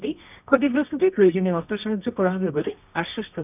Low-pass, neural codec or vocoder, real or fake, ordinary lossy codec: 3.6 kHz; codec, 24 kHz, 0.9 kbps, WavTokenizer, medium music audio release; fake; none